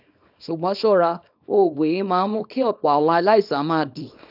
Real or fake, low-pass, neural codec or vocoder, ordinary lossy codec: fake; 5.4 kHz; codec, 24 kHz, 0.9 kbps, WavTokenizer, small release; none